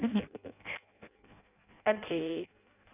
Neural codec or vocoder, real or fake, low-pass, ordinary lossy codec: codec, 16 kHz in and 24 kHz out, 0.6 kbps, FireRedTTS-2 codec; fake; 3.6 kHz; none